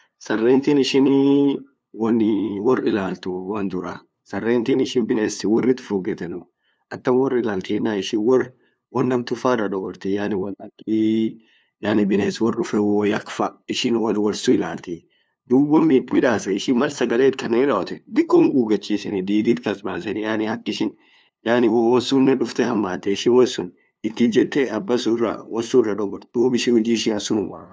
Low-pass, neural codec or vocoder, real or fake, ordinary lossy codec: none; codec, 16 kHz, 2 kbps, FunCodec, trained on LibriTTS, 25 frames a second; fake; none